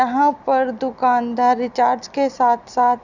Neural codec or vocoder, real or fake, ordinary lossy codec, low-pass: none; real; none; 7.2 kHz